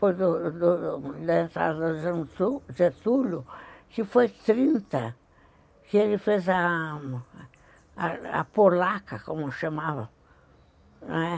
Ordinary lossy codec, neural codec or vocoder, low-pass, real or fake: none; none; none; real